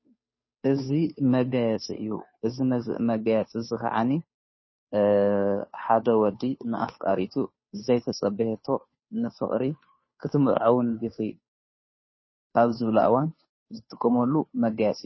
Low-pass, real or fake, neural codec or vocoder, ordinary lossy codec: 7.2 kHz; fake; codec, 16 kHz, 2 kbps, FunCodec, trained on Chinese and English, 25 frames a second; MP3, 24 kbps